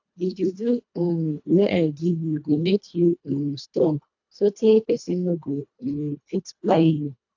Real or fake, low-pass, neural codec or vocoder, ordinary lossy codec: fake; 7.2 kHz; codec, 24 kHz, 1.5 kbps, HILCodec; AAC, 48 kbps